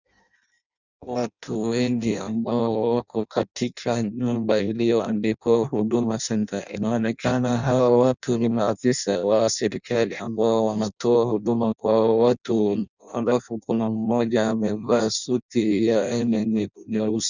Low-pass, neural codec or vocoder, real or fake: 7.2 kHz; codec, 16 kHz in and 24 kHz out, 0.6 kbps, FireRedTTS-2 codec; fake